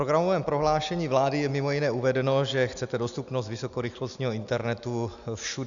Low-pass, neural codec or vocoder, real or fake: 7.2 kHz; none; real